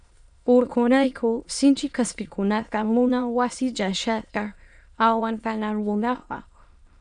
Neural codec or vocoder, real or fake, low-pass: autoencoder, 22.05 kHz, a latent of 192 numbers a frame, VITS, trained on many speakers; fake; 9.9 kHz